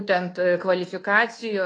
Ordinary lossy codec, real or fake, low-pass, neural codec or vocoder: AAC, 32 kbps; fake; 9.9 kHz; codec, 24 kHz, 1.2 kbps, DualCodec